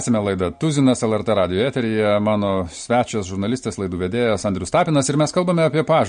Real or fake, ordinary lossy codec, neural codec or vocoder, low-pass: real; MP3, 48 kbps; none; 9.9 kHz